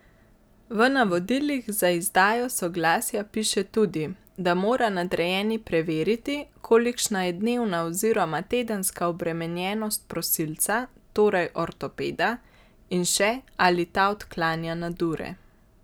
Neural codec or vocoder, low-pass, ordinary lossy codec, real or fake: none; none; none; real